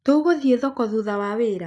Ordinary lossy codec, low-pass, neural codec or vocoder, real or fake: none; none; none; real